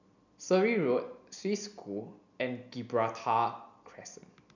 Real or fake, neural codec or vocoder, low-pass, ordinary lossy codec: real; none; 7.2 kHz; none